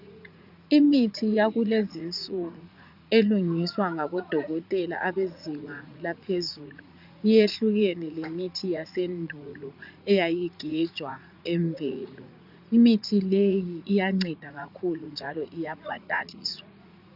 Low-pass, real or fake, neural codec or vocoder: 5.4 kHz; fake; vocoder, 44.1 kHz, 80 mel bands, Vocos